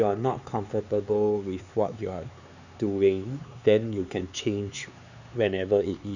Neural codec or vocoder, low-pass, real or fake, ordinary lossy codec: codec, 16 kHz, 4 kbps, X-Codec, HuBERT features, trained on LibriSpeech; 7.2 kHz; fake; none